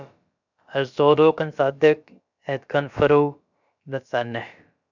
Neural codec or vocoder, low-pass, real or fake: codec, 16 kHz, about 1 kbps, DyCAST, with the encoder's durations; 7.2 kHz; fake